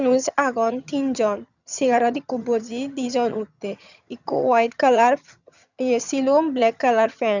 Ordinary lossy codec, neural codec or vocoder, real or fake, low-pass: none; vocoder, 22.05 kHz, 80 mel bands, HiFi-GAN; fake; 7.2 kHz